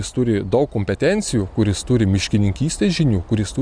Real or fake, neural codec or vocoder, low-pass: real; none; 9.9 kHz